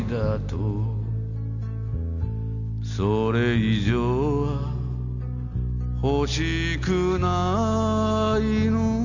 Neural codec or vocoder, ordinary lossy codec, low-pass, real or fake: none; none; 7.2 kHz; real